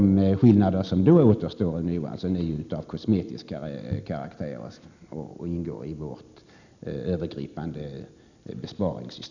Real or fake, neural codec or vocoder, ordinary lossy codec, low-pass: real; none; none; 7.2 kHz